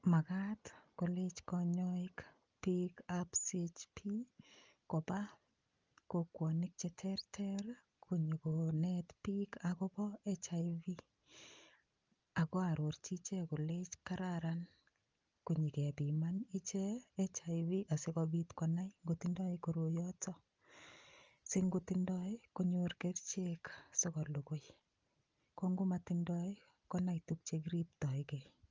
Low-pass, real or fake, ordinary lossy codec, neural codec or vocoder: 7.2 kHz; real; Opus, 24 kbps; none